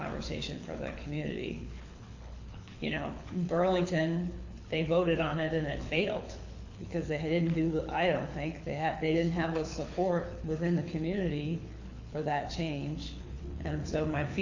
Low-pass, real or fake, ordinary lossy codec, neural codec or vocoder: 7.2 kHz; fake; AAC, 48 kbps; codec, 24 kHz, 6 kbps, HILCodec